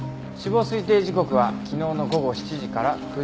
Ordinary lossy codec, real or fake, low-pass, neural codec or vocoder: none; real; none; none